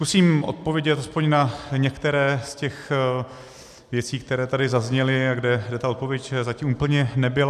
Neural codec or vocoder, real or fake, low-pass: none; real; 14.4 kHz